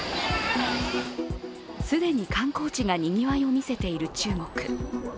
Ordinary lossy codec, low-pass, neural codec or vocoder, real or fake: none; none; none; real